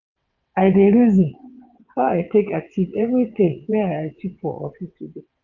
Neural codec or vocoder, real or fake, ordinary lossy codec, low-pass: vocoder, 22.05 kHz, 80 mel bands, WaveNeXt; fake; none; 7.2 kHz